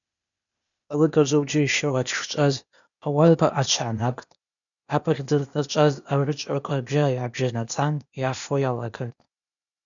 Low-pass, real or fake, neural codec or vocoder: 7.2 kHz; fake; codec, 16 kHz, 0.8 kbps, ZipCodec